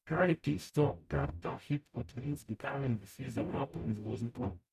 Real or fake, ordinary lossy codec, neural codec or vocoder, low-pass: fake; none; codec, 44.1 kHz, 0.9 kbps, DAC; 14.4 kHz